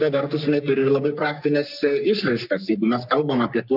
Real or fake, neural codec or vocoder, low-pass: fake; codec, 44.1 kHz, 3.4 kbps, Pupu-Codec; 5.4 kHz